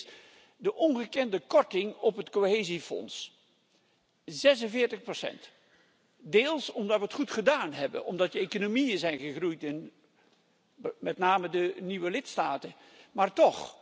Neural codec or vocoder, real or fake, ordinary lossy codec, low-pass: none; real; none; none